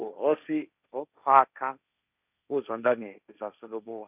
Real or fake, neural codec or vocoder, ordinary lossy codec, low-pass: fake; codec, 16 kHz, 1.1 kbps, Voila-Tokenizer; none; 3.6 kHz